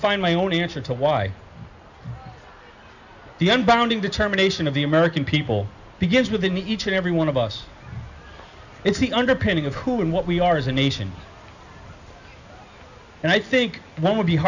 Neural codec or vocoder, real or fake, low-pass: none; real; 7.2 kHz